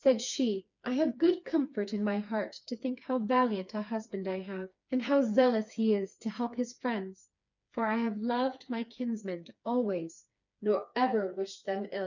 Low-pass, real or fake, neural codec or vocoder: 7.2 kHz; fake; codec, 16 kHz, 4 kbps, FreqCodec, smaller model